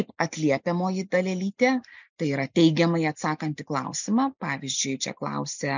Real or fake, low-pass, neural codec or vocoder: real; 7.2 kHz; none